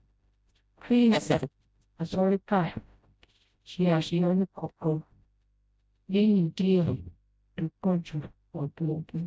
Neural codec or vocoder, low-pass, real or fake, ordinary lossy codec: codec, 16 kHz, 0.5 kbps, FreqCodec, smaller model; none; fake; none